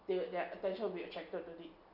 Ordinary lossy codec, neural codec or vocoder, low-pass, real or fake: none; none; 5.4 kHz; real